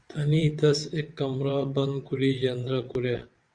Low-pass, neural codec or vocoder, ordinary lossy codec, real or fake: 9.9 kHz; vocoder, 22.05 kHz, 80 mel bands, WaveNeXt; Opus, 64 kbps; fake